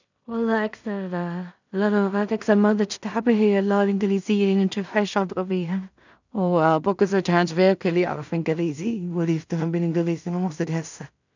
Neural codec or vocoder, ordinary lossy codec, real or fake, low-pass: codec, 16 kHz in and 24 kHz out, 0.4 kbps, LongCat-Audio-Codec, two codebook decoder; none; fake; 7.2 kHz